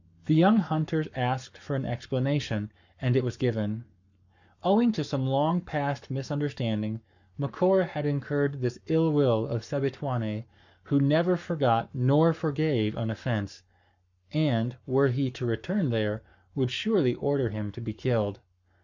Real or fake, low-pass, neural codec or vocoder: fake; 7.2 kHz; codec, 44.1 kHz, 7.8 kbps, Pupu-Codec